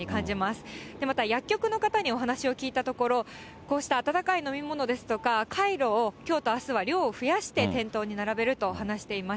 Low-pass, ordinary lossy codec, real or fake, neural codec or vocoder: none; none; real; none